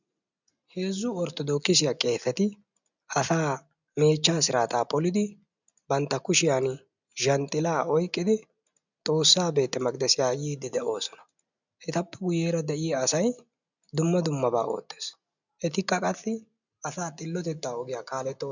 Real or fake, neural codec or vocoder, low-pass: real; none; 7.2 kHz